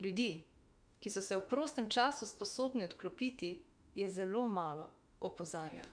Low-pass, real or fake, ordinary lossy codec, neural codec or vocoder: 9.9 kHz; fake; Opus, 64 kbps; autoencoder, 48 kHz, 32 numbers a frame, DAC-VAE, trained on Japanese speech